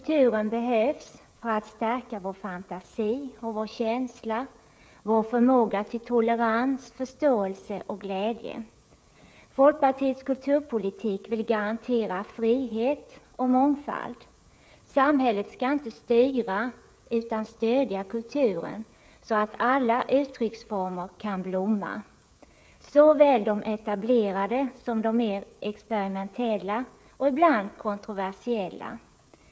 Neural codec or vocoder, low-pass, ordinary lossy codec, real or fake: codec, 16 kHz, 16 kbps, FreqCodec, smaller model; none; none; fake